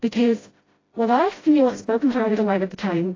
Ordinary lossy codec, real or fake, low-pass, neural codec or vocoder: AAC, 32 kbps; fake; 7.2 kHz; codec, 16 kHz, 0.5 kbps, FreqCodec, smaller model